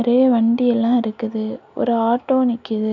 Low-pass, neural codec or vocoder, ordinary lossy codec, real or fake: 7.2 kHz; none; none; real